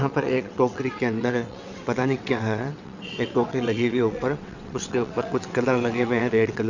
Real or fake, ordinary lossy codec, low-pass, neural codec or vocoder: fake; none; 7.2 kHz; vocoder, 22.05 kHz, 80 mel bands, WaveNeXt